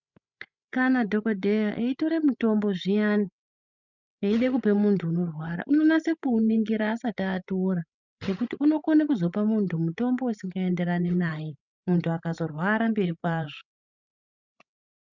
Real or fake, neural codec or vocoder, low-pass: fake; codec, 16 kHz, 16 kbps, FreqCodec, larger model; 7.2 kHz